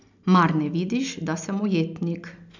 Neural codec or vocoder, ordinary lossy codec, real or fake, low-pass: none; none; real; 7.2 kHz